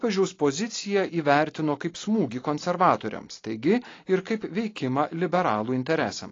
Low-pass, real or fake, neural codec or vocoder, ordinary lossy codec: 7.2 kHz; real; none; AAC, 32 kbps